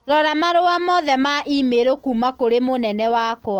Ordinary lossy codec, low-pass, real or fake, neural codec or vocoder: Opus, 24 kbps; 19.8 kHz; real; none